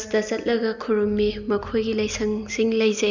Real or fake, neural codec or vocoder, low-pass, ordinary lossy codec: real; none; 7.2 kHz; none